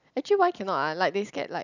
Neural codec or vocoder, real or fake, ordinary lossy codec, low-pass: none; real; none; 7.2 kHz